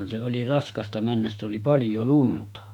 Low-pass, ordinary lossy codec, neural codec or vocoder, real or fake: 19.8 kHz; none; autoencoder, 48 kHz, 32 numbers a frame, DAC-VAE, trained on Japanese speech; fake